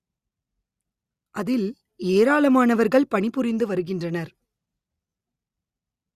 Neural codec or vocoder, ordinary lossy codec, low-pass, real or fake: none; Opus, 64 kbps; 14.4 kHz; real